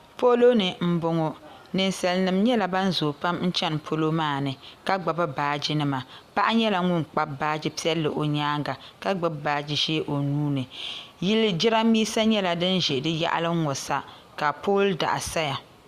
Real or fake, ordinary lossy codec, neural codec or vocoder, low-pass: real; Opus, 64 kbps; none; 14.4 kHz